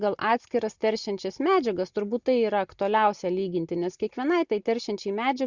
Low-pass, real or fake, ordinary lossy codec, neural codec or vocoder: 7.2 kHz; real; Opus, 64 kbps; none